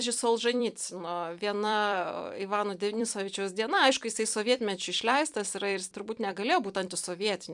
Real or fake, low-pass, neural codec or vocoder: fake; 10.8 kHz; vocoder, 44.1 kHz, 128 mel bands every 256 samples, BigVGAN v2